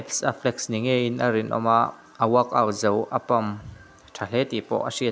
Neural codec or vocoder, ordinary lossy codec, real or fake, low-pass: none; none; real; none